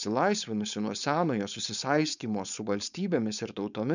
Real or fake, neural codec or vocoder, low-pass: fake; codec, 16 kHz, 4.8 kbps, FACodec; 7.2 kHz